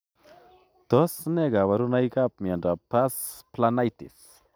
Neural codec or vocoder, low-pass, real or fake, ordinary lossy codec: none; none; real; none